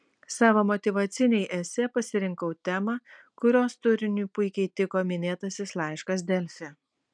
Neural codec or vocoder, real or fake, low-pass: none; real; 9.9 kHz